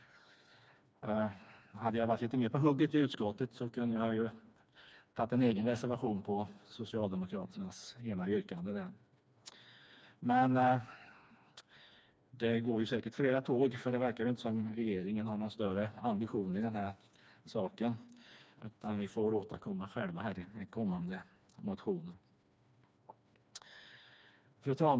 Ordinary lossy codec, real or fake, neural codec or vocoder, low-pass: none; fake; codec, 16 kHz, 2 kbps, FreqCodec, smaller model; none